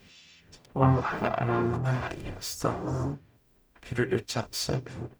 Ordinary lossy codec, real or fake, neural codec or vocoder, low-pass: none; fake; codec, 44.1 kHz, 0.9 kbps, DAC; none